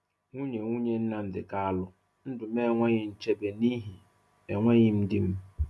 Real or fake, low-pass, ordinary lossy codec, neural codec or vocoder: real; none; none; none